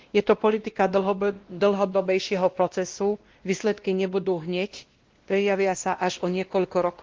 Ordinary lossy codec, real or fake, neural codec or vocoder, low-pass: Opus, 16 kbps; fake; codec, 16 kHz, 1 kbps, X-Codec, WavLM features, trained on Multilingual LibriSpeech; 7.2 kHz